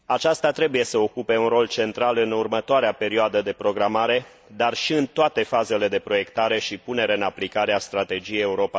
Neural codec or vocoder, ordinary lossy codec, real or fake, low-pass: none; none; real; none